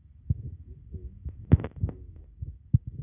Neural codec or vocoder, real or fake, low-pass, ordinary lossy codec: none; real; 3.6 kHz; none